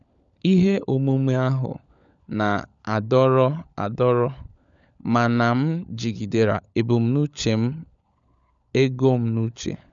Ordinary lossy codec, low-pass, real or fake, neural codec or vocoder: none; 7.2 kHz; fake; codec, 16 kHz, 16 kbps, FunCodec, trained on LibriTTS, 50 frames a second